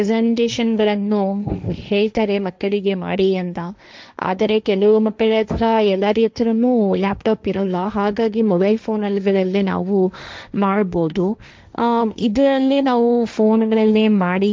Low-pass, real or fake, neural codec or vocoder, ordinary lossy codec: none; fake; codec, 16 kHz, 1.1 kbps, Voila-Tokenizer; none